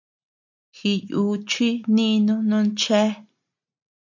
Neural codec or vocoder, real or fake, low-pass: none; real; 7.2 kHz